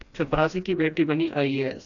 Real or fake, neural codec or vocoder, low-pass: fake; codec, 16 kHz, 1 kbps, FreqCodec, smaller model; 7.2 kHz